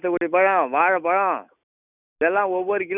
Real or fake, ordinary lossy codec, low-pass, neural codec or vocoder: real; none; 3.6 kHz; none